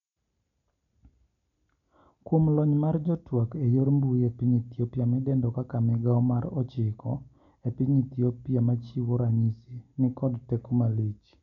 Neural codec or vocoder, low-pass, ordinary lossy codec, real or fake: none; 7.2 kHz; none; real